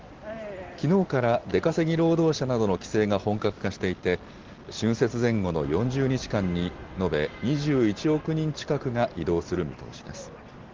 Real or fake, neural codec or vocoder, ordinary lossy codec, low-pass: real; none; Opus, 16 kbps; 7.2 kHz